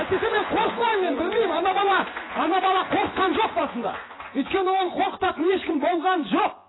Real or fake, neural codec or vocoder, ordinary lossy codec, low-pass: fake; vocoder, 24 kHz, 100 mel bands, Vocos; AAC, 16 kbps; 7.2 kHz